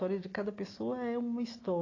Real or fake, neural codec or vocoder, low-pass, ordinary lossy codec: real; none; 7.2 kHz; AAC, 32 kbps